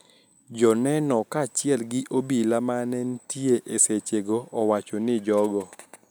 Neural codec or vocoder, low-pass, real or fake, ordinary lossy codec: none; none; real; none